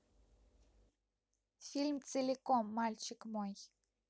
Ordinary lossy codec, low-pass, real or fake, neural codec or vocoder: none; none; real; none